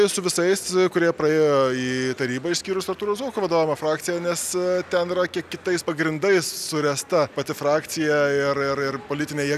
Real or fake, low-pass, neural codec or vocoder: real; 14.4 kHz; none